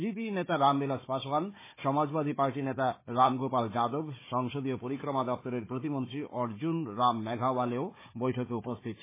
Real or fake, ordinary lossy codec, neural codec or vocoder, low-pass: fake; MP3, 16 kbps; codec, 16 kHz, 4 kbps, FunCodec, trained on Chinese and English, 50 frames a second; 3.6 kHz